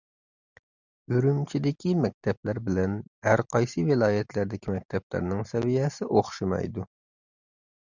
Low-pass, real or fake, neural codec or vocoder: 7.2 kHz; real; none